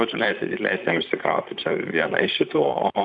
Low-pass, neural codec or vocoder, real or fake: 9.9 kHz; vocoder, 44.1 kHz, 128 mel bands, Pupu-Vocoder; fake